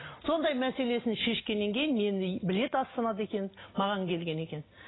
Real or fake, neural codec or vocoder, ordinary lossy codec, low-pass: real; none; AAC, 16 kbps; 7.2 kHz